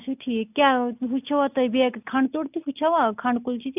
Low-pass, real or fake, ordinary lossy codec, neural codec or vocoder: 3.6 kHz; real; none; none